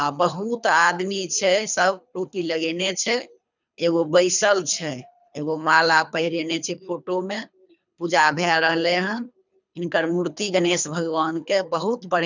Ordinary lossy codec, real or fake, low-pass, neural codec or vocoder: none; fake; 7.2 kHz; codec, 24 kHz, 3 kbps, HILCodec